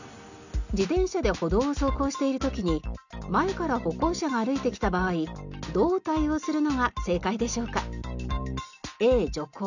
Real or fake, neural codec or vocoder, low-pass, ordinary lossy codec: real; none; 7.2 kHz; none